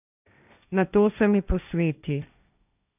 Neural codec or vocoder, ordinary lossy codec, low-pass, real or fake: codec, 16 kHz, 1.1 kbps, Voila-Tokenizer; none; 3.6 kHz; fake